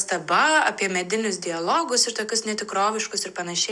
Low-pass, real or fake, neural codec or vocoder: 10.8 kHz; real; none